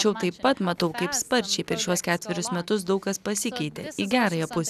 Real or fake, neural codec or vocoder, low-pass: real; none; 14.4 kHz